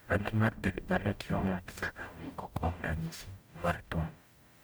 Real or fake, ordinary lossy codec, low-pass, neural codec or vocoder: fake; none; none; codec, 44.1 kHz, 0.9 kbps, DAC